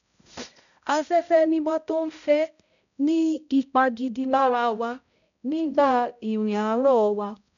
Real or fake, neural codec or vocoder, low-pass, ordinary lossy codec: fake; codec, 16 kHz, 0.5 kbps, X-Codec, HuBERT features, trained on balanced general audio; 7.2 kHz; none